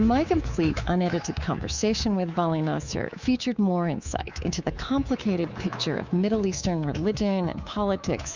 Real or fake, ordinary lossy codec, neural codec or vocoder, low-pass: fake; Opus, 64 kbps; codec, 24 kHz, 3.1 kbps, DualCodec; 7.2 kHz